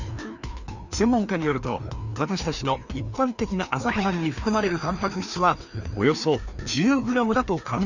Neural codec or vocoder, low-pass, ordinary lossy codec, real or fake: codec, 16 kHz, 2 kbps, FreqCodec, larger model; 7.2 kHz; none; fake